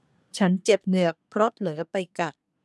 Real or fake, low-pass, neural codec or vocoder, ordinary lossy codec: fake; none; codec, 24 kHz, 1 kbps, SNAC; none